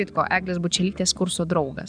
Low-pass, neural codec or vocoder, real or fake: 9.9 kHz; vocoder, 44.1 kHz, 128 mel bands every 512 samples, BigVGAN v2; fake